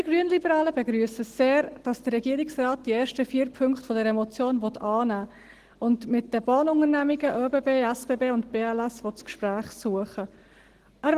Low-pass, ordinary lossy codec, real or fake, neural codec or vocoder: 14.4 kHz; Opus, 16 kbps; real; none